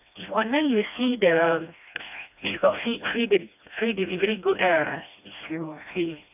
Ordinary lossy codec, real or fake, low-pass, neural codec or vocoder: none; fake; 3.6 kHz; codec, 16 kHz, 1 kbps, FreqCodec, smaller model